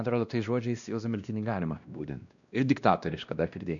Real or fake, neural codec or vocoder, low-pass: fake; codec, 16 kHz, 1 kbps, X-Codec, WavLM features, trained on Multilingual LibriSpeech; 7.2 kHz